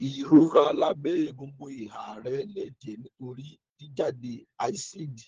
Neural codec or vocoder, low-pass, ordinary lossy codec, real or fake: codec, 16 kHz, 4 kbps, FunCodec, trained on LibriTTS, 50 frames a second; 7.2 kHz; Opus, 16 kbps; fake